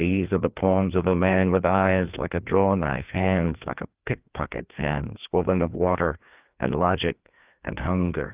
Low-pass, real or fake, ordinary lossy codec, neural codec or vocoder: 3.6 kHz; fake; Opus, 24 kbps; codec, 16 kHz, 2 kbps, FreqCodec, larger model